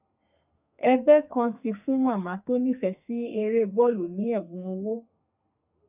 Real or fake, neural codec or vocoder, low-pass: fake; codec, 32 kHz, 1.9 kbps, SNAC; 3.6 kHz